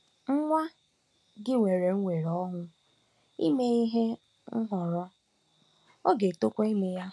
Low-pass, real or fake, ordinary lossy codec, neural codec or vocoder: none; real; none; none